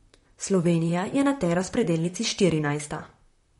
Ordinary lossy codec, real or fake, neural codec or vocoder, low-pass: MP3, 48 kbps; fake; vocoder, 44.1 kHz, 128 mel bands, Pupu-Vocoder; 19.8 kHz